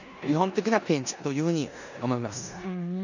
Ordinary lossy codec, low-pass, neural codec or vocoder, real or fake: none; 7.2 kHz; codec, 16 kHz in and 24 kHz out, 0.9 kbps, LongCat-Audio-Codec, four codebook decoder; fake